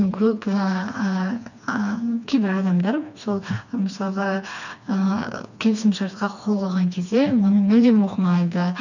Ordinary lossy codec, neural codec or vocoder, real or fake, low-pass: none; codec, 16 kHz, 2 kbps, FreqCodec, smaller model; fake; 7.2 kHz